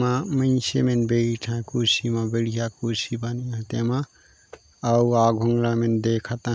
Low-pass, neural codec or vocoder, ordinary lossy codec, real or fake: none; none; none; real